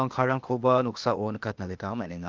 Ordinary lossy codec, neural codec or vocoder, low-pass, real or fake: Opus, 32 kbps; codec, 16 kHz, 0.8 kbps, ZipCodec; 7.2 kHz; fake